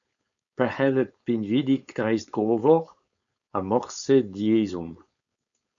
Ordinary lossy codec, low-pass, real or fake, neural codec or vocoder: MP3, 64 kbps; 7.2 kHz; fake; codec, 16 kHz, 4.8 kbps, FACodec